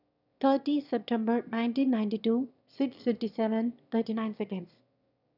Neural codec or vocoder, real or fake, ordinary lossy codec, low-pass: autoencoder, 22.05 kHz, a latent of 192 numbers a frame, VITS, trained on one speaker; fake; none; 5.4 kHz